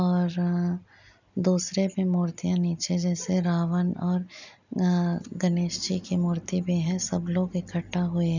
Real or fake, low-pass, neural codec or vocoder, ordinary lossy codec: real; 7.2 kHz; none; none